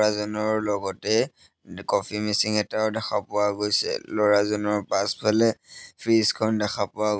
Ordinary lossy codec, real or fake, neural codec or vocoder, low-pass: none; real; none; none